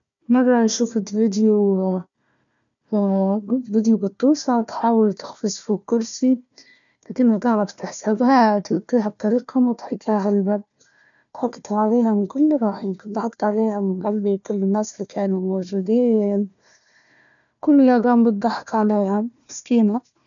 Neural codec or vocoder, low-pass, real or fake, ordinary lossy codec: codec, 16 kHz, 1 kbps, FunCodec, trained on Chinese and English, 50 frames a second; 7.2 kHz; fake; none